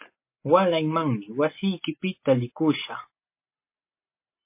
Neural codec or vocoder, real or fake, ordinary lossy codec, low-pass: none; real; MP3, 24 kbps; 3.6 kHz